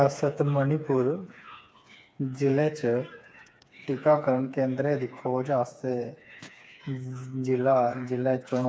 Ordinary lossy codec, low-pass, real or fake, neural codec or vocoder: none; none; fake; codec, 16 kHz, 4 kbps, FreqCodec, smaller model